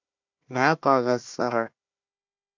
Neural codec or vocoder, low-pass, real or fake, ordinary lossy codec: codec, 16 kHz, 1 kbps, FunCodec, trained on Chinese and English, 50 frames a second; 7.2 kHz; fake; AAC, 48 kbps